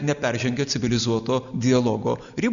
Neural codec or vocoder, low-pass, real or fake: none; 7.2 kHz; real